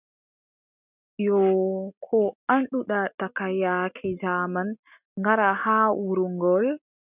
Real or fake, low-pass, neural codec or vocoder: real; 3.6 kHz; none